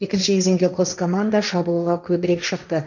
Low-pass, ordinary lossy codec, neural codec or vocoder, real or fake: 7.2 kHz; none; codec, 16 kHz, 1.1 kbps, Voila-Tokenizer; fake